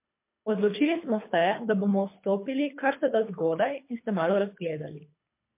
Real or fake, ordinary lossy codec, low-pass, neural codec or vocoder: fake; MP3, 24 kbps; 3.6 kHz; codec, 24 kHz, 3 kbps, HILCodec